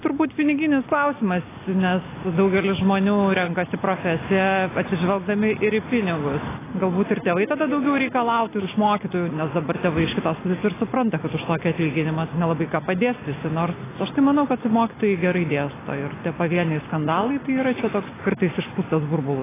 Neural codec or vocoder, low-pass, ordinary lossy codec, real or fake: none; 3.6 kHz; AAC, 16 kbps; real